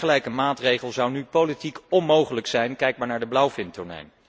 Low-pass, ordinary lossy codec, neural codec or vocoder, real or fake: none; none; none; real